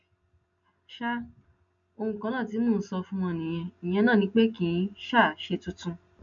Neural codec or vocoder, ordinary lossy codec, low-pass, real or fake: none; AAC, 64 kbps; 7.2 kHz; real